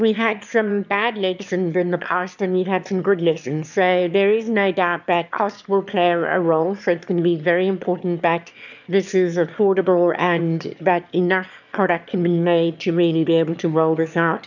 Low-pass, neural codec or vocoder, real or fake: 7.2 kHz; autoencoder, 22.05 kHz, a latent of 192 numbers a frame, VITS, trained on one speaker; fake